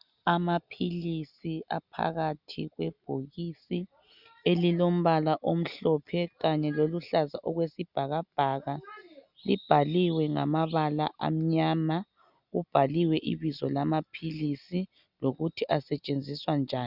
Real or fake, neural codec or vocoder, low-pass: real; none; 5.4 kHz